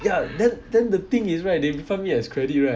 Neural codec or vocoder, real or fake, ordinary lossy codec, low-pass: none; real; none; none